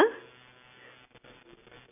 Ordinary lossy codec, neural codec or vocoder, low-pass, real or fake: none; none; 3.6 kHz; real